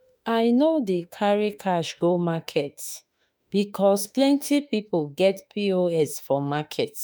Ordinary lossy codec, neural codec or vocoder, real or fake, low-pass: none; autoencoder, 48 kHz, 32 numbers a frame, DAC-VAE, trained on Japanese speech; fake; none